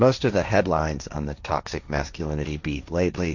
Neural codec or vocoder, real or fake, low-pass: codec, 16 kHz, 1.1 kbps, Voila-Tokenizer; fake; 7.2 kHz